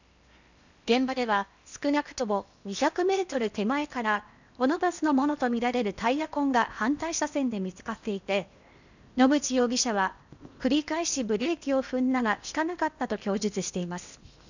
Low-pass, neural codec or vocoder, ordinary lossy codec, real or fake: 7.2 kHz; codec, 16 kHz in and 24 kHz out, 0.8 kbps, FocalCodec, streaming, 65536 codes; none; fake